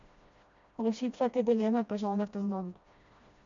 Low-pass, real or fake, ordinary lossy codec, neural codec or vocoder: 7.2 kHz; fake; MP3, 64 kbps; codec, 16 kHz, 1 kbps, FreqCodec, smaller model